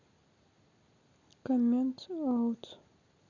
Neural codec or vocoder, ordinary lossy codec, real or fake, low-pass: none; Opus, 64 kbps; real; 7.2 kHz